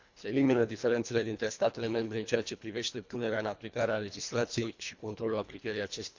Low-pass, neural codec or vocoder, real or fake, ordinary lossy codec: 7.2 kHz; codec, 24 kHz, 1.5 kbps, HILCodec; fake; MP3, 64 kbps